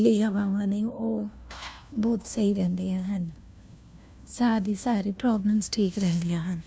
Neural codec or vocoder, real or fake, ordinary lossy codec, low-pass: codec, 16 kHz, 1 kbps, FunCodec, trained on LibriTTS, 50 frames a second; fake; none; none